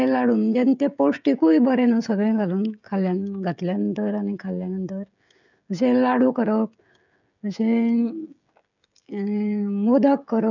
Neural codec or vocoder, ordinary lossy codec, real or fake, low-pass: codec, 16 kHz, 16 kbps, FreqCodec, smaller model; none; fake; 7.2 kHz